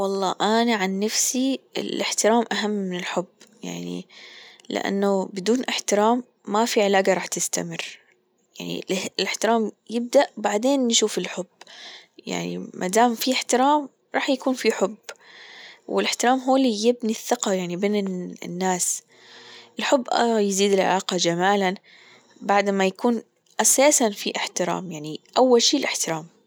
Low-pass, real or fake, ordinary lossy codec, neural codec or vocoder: none; real; none; none